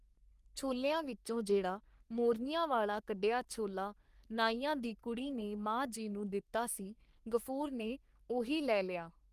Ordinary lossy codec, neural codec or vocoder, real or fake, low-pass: Opus, 32 kbps; codec, 44.1 kHz, 3.4 kbps, Pupu-Codec; fake; 14.4 kHz